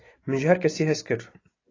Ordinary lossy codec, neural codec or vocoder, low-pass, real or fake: AAC, 32 kbps; none; 7.2 kHz; real